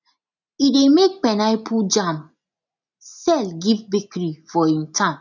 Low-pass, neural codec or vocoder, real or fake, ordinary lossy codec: 7.2 kHz; none; real; none